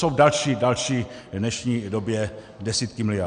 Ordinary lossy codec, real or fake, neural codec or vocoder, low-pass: AAC, 96 kbps; real; none; 9.9 kHz